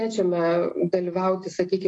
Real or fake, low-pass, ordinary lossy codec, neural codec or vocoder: real; 10.8 kHz; AAC, 32 kbps; none